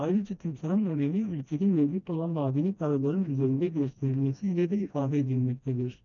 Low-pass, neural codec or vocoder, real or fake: 7.2 kHz; codec, 16 kHz, 1 kbps, FreqCodec, smaller model; fake